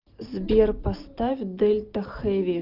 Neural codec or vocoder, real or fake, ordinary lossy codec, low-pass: none; real; Opus, 24 kbps; 5.4 kHz